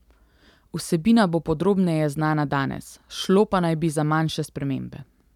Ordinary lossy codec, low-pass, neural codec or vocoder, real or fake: none; 19.8 kHz; none; real